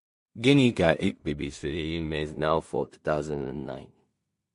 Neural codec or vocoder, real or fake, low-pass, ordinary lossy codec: codec, 16 kHz in and 24 kHz out, 0.4 kbps, LongCat-Audio-Codec, two codebook decoder; fake; 10.8 kHz; MP3, 48 kbps